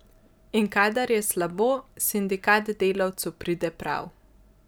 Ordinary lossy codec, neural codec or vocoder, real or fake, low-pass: none; none; real; none